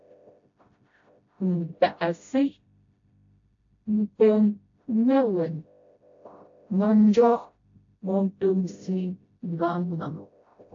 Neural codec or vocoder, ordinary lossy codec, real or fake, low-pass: codec, 16 kHz, 0.5 kbps, FreqCodec, smaller model; AAC, 48 kbps; fake; 7.2 kHz